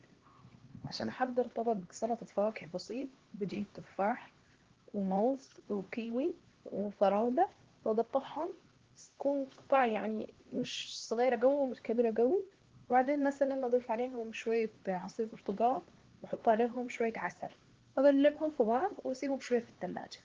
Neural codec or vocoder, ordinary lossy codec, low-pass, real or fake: codec, 16 kHz, 2 kbps, X-Codec, HuBERT features, trained on LibriSpeech; Opus, 16 kbps; 7.2 kHz; fake